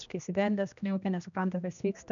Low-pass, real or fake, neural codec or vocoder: 7.2 kHz; fake; codec, 16 kHz, 1 kbps, X-Codec, HuBERT features, trained on general audio